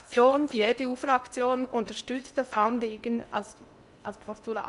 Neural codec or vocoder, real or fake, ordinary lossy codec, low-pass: codec, 16 kHz in and 24 kHz out, 0.8 kbps, FocalCodec, streaming, 65536 codes; fake; MP3, 96 kbps; 10.8 kHz